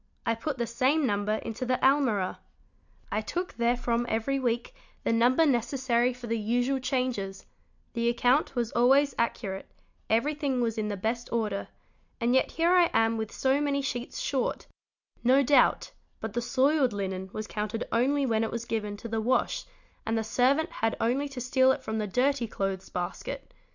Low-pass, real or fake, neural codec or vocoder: 7.2 kHz; real; none